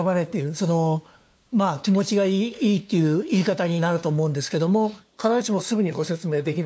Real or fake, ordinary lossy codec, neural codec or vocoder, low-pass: fake; none; codec, 16 kHz, 2 kbps, FunCodec, trained on LibriTTS, 25 frames a second; none